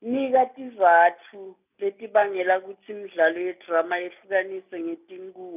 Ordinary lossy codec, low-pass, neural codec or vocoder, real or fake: none; 3.6 kHz; none; real